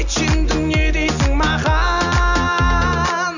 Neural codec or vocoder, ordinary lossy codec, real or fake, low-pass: none; none; real; 7.2 kHz